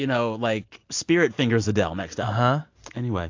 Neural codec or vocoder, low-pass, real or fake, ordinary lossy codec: none; 7.2 kHz; real; AAC, 48 kbps